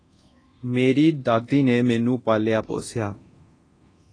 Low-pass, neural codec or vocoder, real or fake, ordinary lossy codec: 9.9 kHz; codec, 24 kHz, 0.9 kbps, DualCodec; fake; AAC, 32 kbps